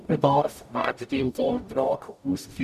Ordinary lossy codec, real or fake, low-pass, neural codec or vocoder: none; fake; 14.4 kHz; codec, 44.1 kHz, 0.9 kbps, DAC